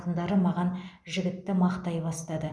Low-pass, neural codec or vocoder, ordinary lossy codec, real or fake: none; none; none; real